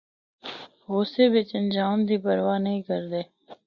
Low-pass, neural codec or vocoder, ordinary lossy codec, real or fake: 7.2 kHz; none; Opus, 64 kbps; real